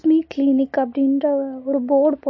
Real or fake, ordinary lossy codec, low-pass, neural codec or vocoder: real; MP3, 32 kbps; 7.2 kHz; none